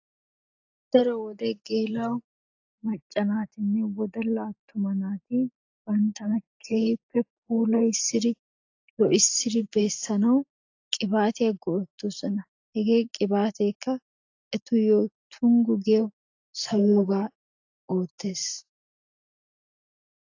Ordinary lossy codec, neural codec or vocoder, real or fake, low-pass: AAC, 48 kbps; none; real; 7.2 kHz